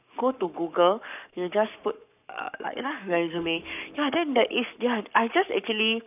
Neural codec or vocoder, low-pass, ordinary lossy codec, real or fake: codec, 44.1 kHz, 7.8 kbps, Pupu-Codec; 3.6 kHz; none; fake